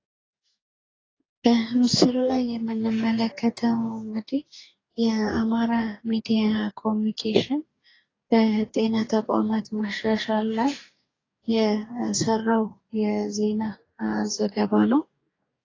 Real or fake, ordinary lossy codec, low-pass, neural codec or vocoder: fake; AAC, 32 kbps; 7.2 kHz; codec, 44.1 kHz, 2.6 kbps, DAC